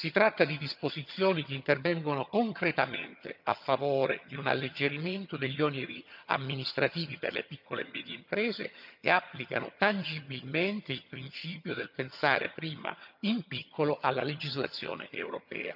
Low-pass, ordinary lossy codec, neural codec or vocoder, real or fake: 5.4 kHz; none; vocoder, 22.05 kHz, 80 mel bands, HiFi-GAN; fake